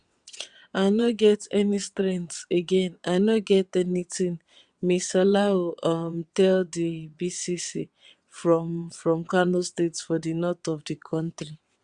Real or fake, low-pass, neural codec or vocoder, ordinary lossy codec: fake; 9.9 kHz; vocoder, 22.05 kHz, 80 mel bands, WaveNeXt; Opus, 64 kbps